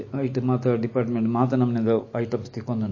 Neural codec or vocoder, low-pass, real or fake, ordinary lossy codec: none; 7.2 kHz; real; MP3, 32 kbps